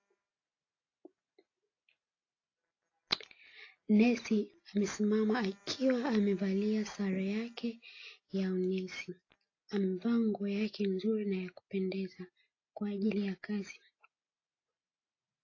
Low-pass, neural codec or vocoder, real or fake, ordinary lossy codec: 7.2 kHz; none; real; AAC, 32 kbps